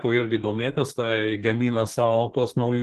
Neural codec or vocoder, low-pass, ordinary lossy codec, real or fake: codec, 32 kHz, 1.9 kbps, SNAC; 14.4 kHz; Opus, 16 kbps; fake